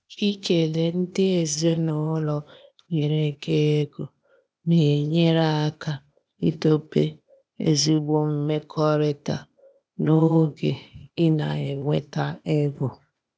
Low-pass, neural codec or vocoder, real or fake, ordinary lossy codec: none; codec, 16 kHz, 0.8 kbps, ZipCodec; fake; none